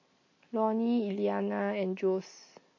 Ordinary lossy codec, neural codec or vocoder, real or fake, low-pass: MP3, 32 kbps; none; real; 7.2 kHz